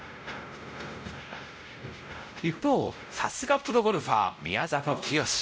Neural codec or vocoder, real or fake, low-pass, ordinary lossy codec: codec, 16 kHz, 0.5 kbps, X-Codec, WavLM features, trained on Multilingual LibriSpeech; fake; none; none